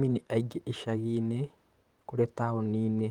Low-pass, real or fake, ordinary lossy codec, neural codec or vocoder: 19.8 kHz; fake; Opus, 24 kbps; vocoder, 44.1 kHz, 128 mel bands, Pupu-Vocoder